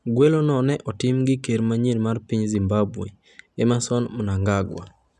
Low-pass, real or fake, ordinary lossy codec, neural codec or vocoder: none; real; none; none